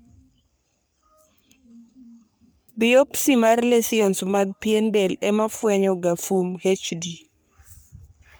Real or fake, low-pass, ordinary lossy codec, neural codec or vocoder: fake; none; none; codec, 44.1 kHz, 3.4 kbps, Pupu-Codec